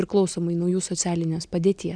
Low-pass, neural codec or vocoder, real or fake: 9.9 kHz; none; real